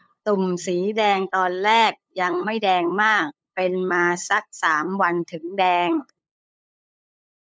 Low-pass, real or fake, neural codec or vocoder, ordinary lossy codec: none; fake; codec, 16 kHz, 8 kbps, FunCodec, trained on LibriTTS, 25 frames a second; none